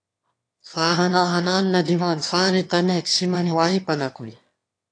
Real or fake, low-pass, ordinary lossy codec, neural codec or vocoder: fake; 9.9 kHz; AAC, 48 kbps; autoencoder, 22.05 kHz, a latent of 192 numbers a frame, VITS, trained on one speaker